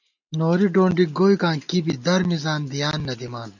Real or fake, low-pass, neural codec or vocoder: real; 7.2 kHz; none